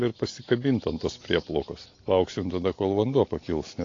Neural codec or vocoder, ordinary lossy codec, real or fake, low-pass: none; AAC, 32 kbps; real; 7.2 kHz